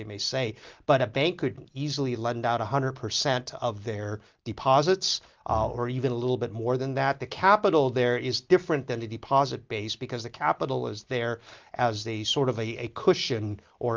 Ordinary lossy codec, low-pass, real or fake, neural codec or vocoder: Opus, 32 kbps; 7.2 kHz; real; none